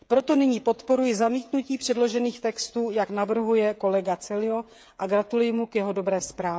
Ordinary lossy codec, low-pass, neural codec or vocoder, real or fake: none; none; codec, 16 kHz, 16 kbps, FreqCodec, smaller model; fake